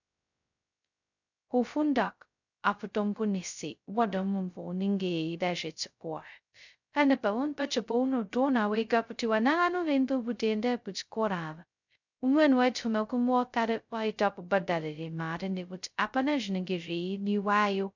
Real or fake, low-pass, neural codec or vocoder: fake; 7.2 kHz; codec, 16 kHz, 0.2 kbps, FocalCodec